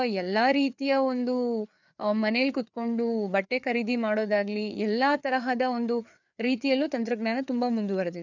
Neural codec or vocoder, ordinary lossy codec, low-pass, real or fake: codec, 16 kHz, 4 kbps, FreqCodec, larger model; none; 7.2 kHz; fake